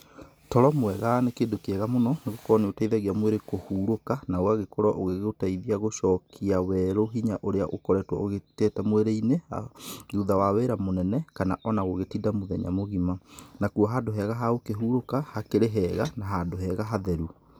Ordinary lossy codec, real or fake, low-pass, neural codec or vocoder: none; real; none; none